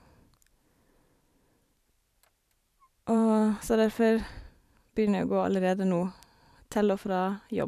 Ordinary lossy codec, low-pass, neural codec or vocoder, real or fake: none; 14.4 kHz; vocoder, 44.1 kHz, 128 mel bands every 512 samples, BigVGAN v2; fake